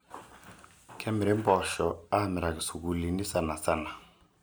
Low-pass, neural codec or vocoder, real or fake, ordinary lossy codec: none; none; real; none